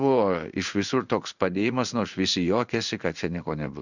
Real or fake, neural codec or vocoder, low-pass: fake; codec, 16 kHz in and 24 kHz out, 1 kbps, XY-Tokenizer; 7.2 kHz